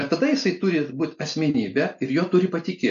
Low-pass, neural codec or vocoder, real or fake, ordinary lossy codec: 7.2 kHz; none; real; AAC, 64 kbps